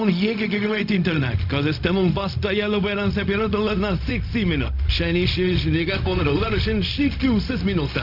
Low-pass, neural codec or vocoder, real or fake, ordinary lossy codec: 5.4 kHz; codec, 16 kHz, 0.4 kbps, LongCat-Audio-Codec; fake; none